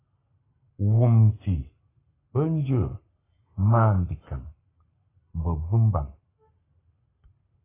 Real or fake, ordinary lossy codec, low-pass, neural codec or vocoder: fake; AAC, 16 kbps; 3.6 kHz; codec, 44.1 kHz, 7.8 kbps, Pupu-Codec